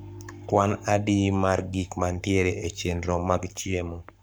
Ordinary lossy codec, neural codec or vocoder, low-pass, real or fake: none; codec, 44.1 kHz, 7.8 kbps, Pupu-Codec; none; fake